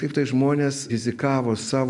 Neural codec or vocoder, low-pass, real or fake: none; 10.8 kHz; real